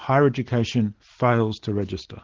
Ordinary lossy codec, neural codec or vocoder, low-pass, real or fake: Opus, 16 kbps; none; 7.2 kHz; real